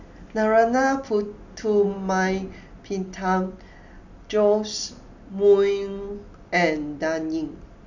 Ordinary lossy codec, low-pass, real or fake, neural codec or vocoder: none; 7.2 kHz; real; none